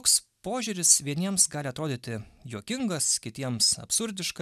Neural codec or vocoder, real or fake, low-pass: none; real; 14.4 kHz